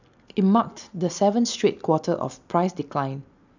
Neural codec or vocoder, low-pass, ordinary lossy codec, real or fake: none; 7.2 kHz; none; real